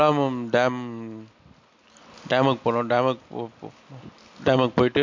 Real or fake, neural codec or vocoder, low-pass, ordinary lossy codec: real; none; 7.2 kHz; MP3, 48 kbps